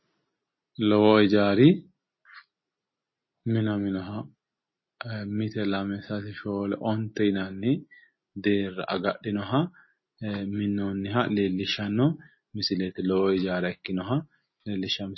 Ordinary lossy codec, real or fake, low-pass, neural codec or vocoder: MP3, 24 kbps; real; 7.2 kHz; none